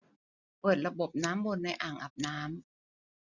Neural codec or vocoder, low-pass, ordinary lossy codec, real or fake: none; 7.2 kHz; none; real